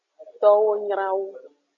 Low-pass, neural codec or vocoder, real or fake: 7.2 kHz; none; real